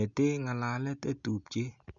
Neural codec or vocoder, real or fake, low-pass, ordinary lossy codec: none; real; 7.2 kHz; none